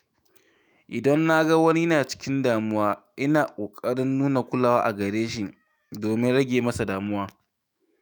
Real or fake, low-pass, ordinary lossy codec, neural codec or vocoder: fake; none; none; autoencoder, 48 kHz, 128 numbers a frame, DAC-VAE, trained on Japanese speech